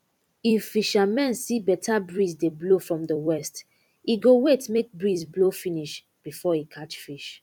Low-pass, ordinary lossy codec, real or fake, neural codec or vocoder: 19.8 kHz; none; fake; vocoder, 44.1 kHz, 128 mel bands every 256 samples, BigVGAN v2